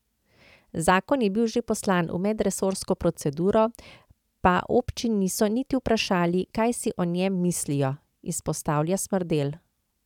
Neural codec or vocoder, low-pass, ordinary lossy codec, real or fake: vocoder, 44.1 kHz, 128 mel bands every 512 samples, BigVGAN v2; 19.8 kHz; none; fake